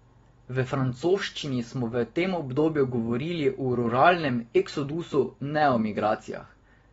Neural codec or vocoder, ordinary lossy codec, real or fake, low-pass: none; AAC, 24 kbps; real; 14.4 kHz